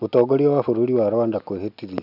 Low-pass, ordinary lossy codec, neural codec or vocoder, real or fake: 5.4 kHz; none; none; real